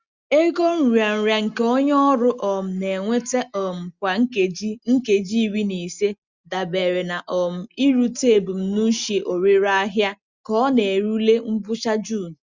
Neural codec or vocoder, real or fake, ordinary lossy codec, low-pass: none; real; Opus, 64 kbps; 7.2 kHz